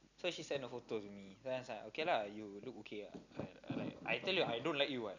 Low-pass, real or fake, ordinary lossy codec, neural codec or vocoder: 7.2 kHz; real; none; none